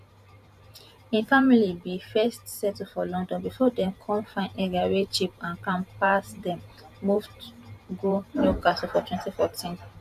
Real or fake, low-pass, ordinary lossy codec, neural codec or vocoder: fake; 14.4 kHz; none; vocoder, 44.1 kHz, 128 mel bands every 512 samples, BigVGAN v2